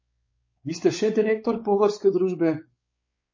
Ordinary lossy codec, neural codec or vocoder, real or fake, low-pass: MP3, 32 kbps; codec, 16 kHz, 4 kbps, X-Codec, WavLM features, trained on Multilingual LibriSpeech; fake; 7.2 kHz